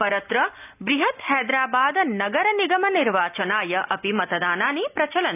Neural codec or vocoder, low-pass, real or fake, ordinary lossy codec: none; 3.6 kHz; real; none